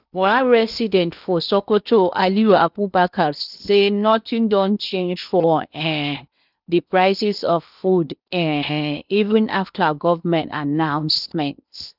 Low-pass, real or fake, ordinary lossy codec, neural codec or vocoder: 5.4 kHz; fake; none; codec, 16 kHz in and 24 kHz out, 0.8 kbps, FocalCodec, streaming, 65536 codes